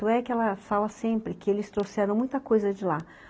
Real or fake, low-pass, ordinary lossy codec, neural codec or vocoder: real; none; none; none